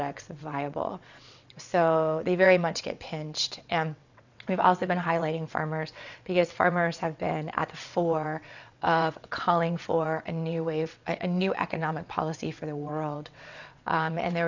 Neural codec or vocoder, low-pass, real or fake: vocoder, 22.05 kHz, 80 mel bands, WaveNeXt; 7.2 kHz; fake